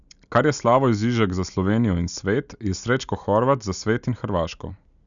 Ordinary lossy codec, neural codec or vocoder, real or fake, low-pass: none; none; real; 7.2 kHz